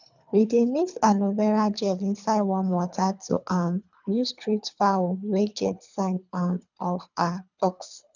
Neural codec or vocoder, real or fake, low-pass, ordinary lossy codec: codec, 24 kHz, 3 kbps, HILCodec; fake; 7.2 kHz; none